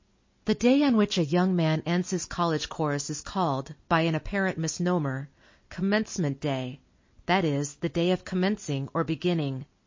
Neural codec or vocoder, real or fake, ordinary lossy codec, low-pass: none; real; MP3, 32 kbps; 7.2 kHz